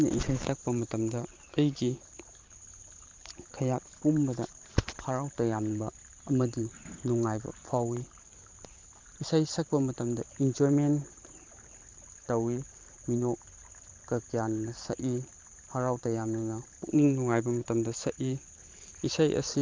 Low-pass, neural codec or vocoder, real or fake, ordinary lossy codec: 7.2 kHz; vocoder, 44.1 kHz, 128 mel bands every 512 samples, BigVGAN v2; fake; Opus, 32 kbps